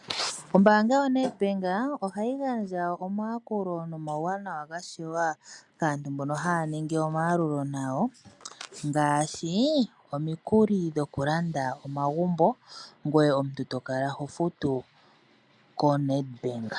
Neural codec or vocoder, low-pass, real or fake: none; 10.8 kHz; real